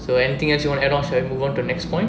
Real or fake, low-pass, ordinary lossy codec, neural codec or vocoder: real; none; none; none